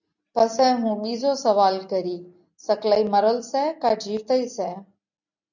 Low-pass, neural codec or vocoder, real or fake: 7.2 kHz; none; real